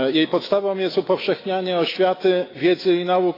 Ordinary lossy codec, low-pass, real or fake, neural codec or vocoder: AAC, 24 kbps; 5.4 kHz; fake; autoencoder, 48 kHz, 128 numbers a frame, DAC-VAE, trained on Japanese speech